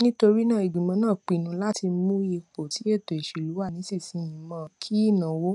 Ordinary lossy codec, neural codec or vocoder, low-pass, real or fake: none; none; 10.8 kHz; real